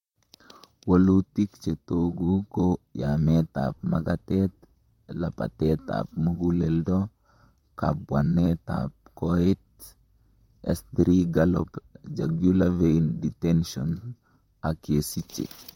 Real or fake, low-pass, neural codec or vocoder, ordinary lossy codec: fake; 19.8 kHz; vocoder, 44.1 kHz, 128 mel bands, Pupu-Vocoder; MP3, 64 kbps